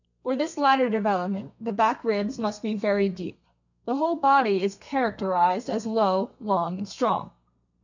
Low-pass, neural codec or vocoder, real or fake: 7.2 kHz; codec, 32 kHz, 1.9 kbps, SNAC; fake